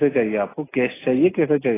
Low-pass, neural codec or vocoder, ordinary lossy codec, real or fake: 3.6 kHz; none; AAC, 16 kbps; real